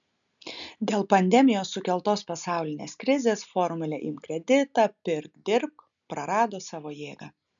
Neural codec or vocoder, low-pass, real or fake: none; 7.2 kHz; real